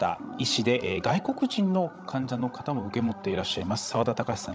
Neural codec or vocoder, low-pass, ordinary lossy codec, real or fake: codec, 16 kHz, 16 kbps, FreqCodec, larger model; none; none; fake